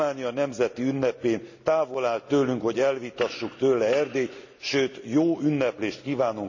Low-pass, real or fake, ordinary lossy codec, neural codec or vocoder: 7.2 kHz; real; none; none